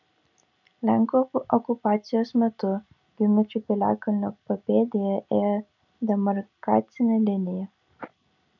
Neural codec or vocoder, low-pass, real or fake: none; 7.2 kHz; real